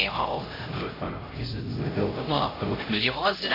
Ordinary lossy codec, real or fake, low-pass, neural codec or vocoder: AAC, 24 kbps; fake; 5.4 kHz; codec, 16 kHz, 0.5 kbps, X-Codec, HuBERT features, trained on LibriSpeech